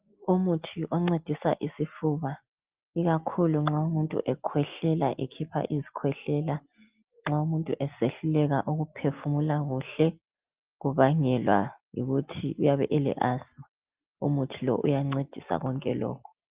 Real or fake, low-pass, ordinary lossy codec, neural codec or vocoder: real; 3.6 kHz; Opus, 32 kbps; none